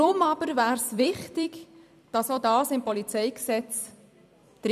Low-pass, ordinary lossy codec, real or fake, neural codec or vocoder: 14.4 kHz; none; fake; vocoder, 44.1 kHz, 128 mel bands every 256 samples, BigVGAN v2